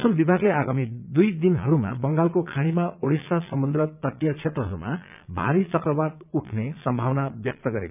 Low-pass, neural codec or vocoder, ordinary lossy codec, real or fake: 3.6 kHz; vocoder, 22.05 kHz, 80 mel bands, Vocos; none; fake